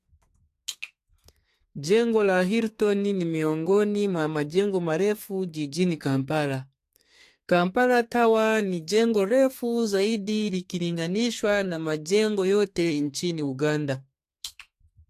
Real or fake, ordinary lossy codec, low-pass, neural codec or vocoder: fake; AAC, 64 kbps; 14.4 kHz; codec, 32 kHz, 1.9 kbps, SNAC